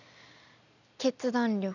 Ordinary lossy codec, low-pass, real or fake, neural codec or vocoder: none; 7.2 kHz; real; none